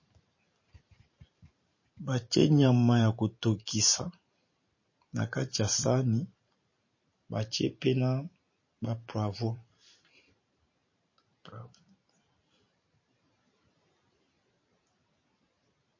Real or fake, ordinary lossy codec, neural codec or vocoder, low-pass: fake; MP3, 32 kbps; vocoder, 44.1 kHz, 128 mel bands every 512 samples, BigVGAN v2; 7.2 kHz